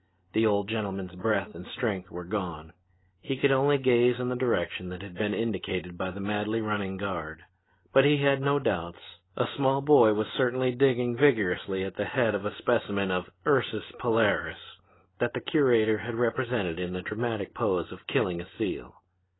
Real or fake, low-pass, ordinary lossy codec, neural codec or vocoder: real; 7.2 kHz; AAC, 16 kbps; none